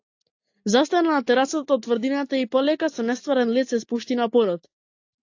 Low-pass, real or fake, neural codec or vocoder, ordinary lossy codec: 7.2 kHz; real; none; AAC, 48 kbps